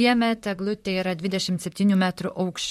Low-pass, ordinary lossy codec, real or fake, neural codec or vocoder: 19.8 kHz; MP3, 64 kbps; fake; vocoder, 44.1 kHz, 128 mel bands, Pupu-Vocoder